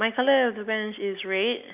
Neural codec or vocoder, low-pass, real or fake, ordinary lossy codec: none; 3.6 kHz; real; AAC, 32 kbps